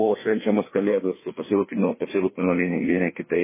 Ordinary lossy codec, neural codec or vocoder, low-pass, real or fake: MP3, 16 kbps; codec, 16 kHz, 2 kbps, FreqCodec, larger model; 3.6 kHz; fake